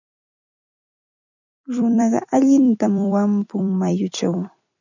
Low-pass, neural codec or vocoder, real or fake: 7.2 kHz; vocoder, 44.1 kHz, 128 mel bands every 256 samples, BigVGAN v2; fake